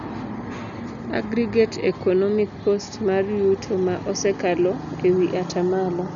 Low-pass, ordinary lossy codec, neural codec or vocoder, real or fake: 7.2 kHz; none; none; real